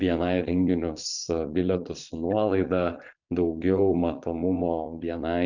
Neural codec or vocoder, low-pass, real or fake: vocoder, 22.05 kHz, 80 mel bands, Vocos; 7.2 kHz; fake